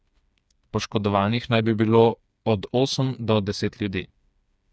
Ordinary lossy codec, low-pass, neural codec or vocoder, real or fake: none; none; codec, 16 kHz, 4 kbps, FreqCodec, smaller model; fake